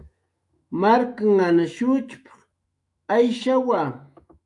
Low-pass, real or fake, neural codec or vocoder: 10.8 kHz; fake; autoencoder, 48 kHz, 128 numbers a frame, DAC-VAE, trained on Japanese speech